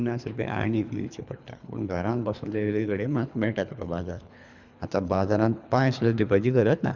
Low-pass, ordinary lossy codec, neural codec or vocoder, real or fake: 7.2 kHz; none; codec, 24 kHz, 3 kbps, HILCodec; fake